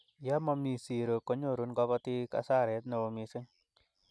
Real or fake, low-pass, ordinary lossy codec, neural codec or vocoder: real; none; none; none